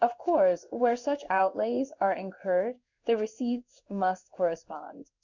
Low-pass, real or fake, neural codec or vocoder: 7.2 kHz; fake; codec, 16 kHz in and 24 kHz out, 1 kbps, XY-Tokenizer